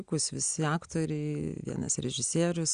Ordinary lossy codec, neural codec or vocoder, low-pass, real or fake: Opus, 64 kbps; none; 9.9 kHz; real